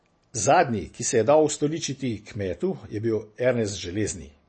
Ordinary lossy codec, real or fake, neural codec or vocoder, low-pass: MP3, 32 kbps; real; none; 9.9 kHz